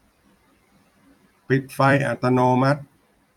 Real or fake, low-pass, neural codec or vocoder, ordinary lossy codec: fake; 19.8 kHz; vocoder, 44.1 kHz, 128 mel bands every 256 samples, BigVGAN v2; none